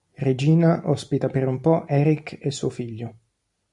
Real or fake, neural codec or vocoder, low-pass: real; none; 10.8 kHz